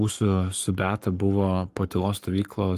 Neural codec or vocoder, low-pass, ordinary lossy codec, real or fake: codec, 44.1 kHz, 7.8 kbps, Pupu-Codec; 14.4 kHz; Opus, 32 kbps; fake